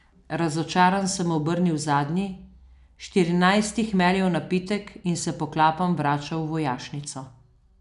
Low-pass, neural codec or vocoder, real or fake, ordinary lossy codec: 10.8 kHz; none; real; none